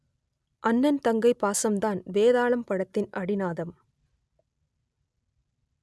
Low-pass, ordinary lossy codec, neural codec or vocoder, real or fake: none; none; none; real